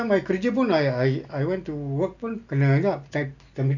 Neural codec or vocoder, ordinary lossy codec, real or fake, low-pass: none; none; real; 7.2 kHz